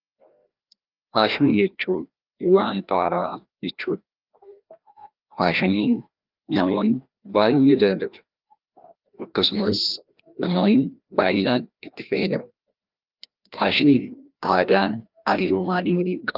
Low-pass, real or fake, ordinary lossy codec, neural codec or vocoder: 5.4 kHz; fake; Opus, 32 kbps; codec, 16 kHz, 1 kbps, FreqCodec, larger model